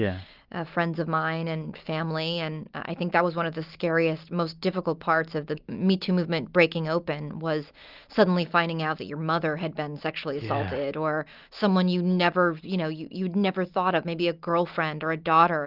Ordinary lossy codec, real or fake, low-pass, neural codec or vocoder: Opus, 24 kbps; real; 5.4 kHz; none